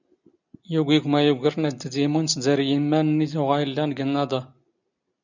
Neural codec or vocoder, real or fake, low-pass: none; real; 7.2 kHz